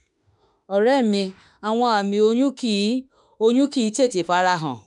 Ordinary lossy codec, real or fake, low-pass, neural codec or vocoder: none; fake; 10.8 kHz; autoencoder, 48 kHz, 32 numbers a frame, DAC-VAE, trained on Japanese speech